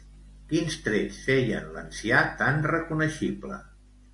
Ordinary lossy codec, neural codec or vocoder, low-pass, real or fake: AAC, 64 kbps; none; 10.8 kHz; real